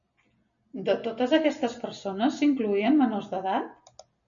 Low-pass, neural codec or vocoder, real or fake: 7.2 kHz; none; real